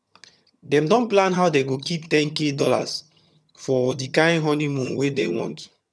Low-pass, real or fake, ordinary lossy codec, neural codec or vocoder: none; fake; none; vocoder, 22.05 kHz, 80 mel bands, HiFi-GAN